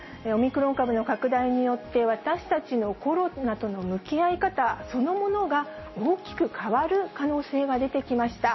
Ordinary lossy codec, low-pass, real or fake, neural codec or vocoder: MP3, 24 kbps; 7.2 kHz; real; none